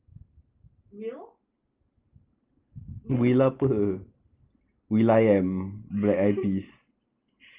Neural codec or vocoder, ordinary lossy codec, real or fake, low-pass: none; Opus, 32 kbps; real; 3.6 kHz